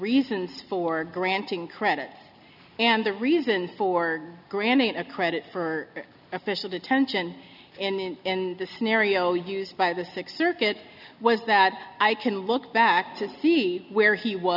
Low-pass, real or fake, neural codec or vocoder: 5.4 kHz; real; none